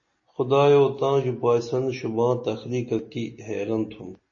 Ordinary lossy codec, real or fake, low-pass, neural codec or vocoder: MP3, 32 kbps; real; 7.2 kHz; none